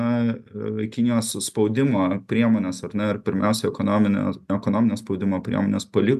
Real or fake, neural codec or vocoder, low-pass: real; none; 14.4 kHz